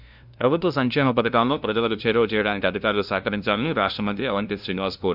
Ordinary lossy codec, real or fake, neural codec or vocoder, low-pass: none; fake; codec, 16 kHz, 0.5 kbps, FunCodec, trained on LibriTTS, 25 frames a second; 5.4 kHz